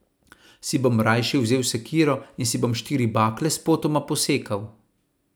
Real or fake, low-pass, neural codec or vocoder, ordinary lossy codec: real; none; none; none